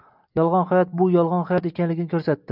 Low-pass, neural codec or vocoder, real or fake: 5.4 kHz; none; real